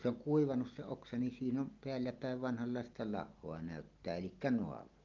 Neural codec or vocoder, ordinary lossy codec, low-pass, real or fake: none; Opus, 32 kbps; 7.2 kHz; real